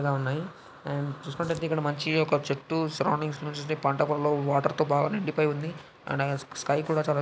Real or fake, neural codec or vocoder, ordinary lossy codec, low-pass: real; none; none; none